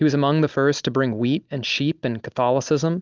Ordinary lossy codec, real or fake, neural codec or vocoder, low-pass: Opus, 24 kbps; real; none; 7.2 kHz